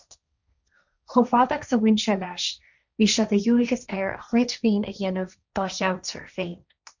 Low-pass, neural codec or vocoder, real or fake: 7.2 kHz; codec, 16 kHz, 1.1 kbps, Voila-Tokenizer; fake